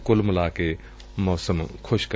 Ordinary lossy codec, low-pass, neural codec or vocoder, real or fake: none; none; none; real